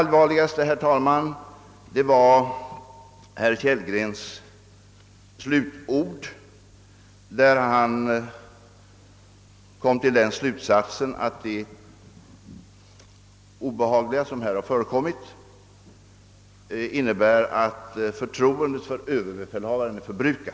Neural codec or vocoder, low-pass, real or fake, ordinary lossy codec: none; none; real; none